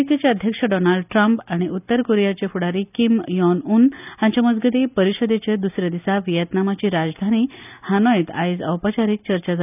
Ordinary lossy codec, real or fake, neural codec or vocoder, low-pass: none; real; none; 3.6 kHz